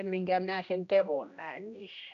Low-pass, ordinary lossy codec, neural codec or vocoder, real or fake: 7.2 kHz; none; codec, 16 kHz, 1 kbps, X-Codec, HuBERT features, trained on general audio; fake